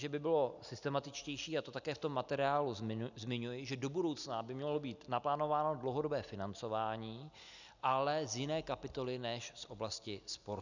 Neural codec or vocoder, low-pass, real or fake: none; 7.2 kHz; real